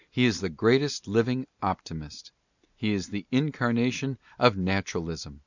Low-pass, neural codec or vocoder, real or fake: 7.2 kHz; none; real